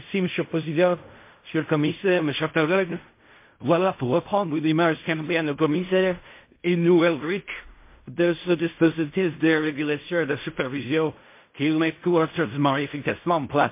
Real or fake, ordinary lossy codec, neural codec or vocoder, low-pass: fake; MP3, 24 kbps; codec, 16 kHz in and 24 kHz out, 0.4 kbps, LongCat-Audio-Codec, fine tuned four codebook decoder; 3.6 kHz